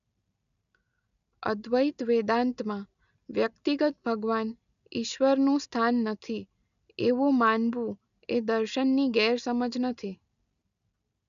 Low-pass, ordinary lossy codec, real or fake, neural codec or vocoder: 7.2 kHz; none; real; none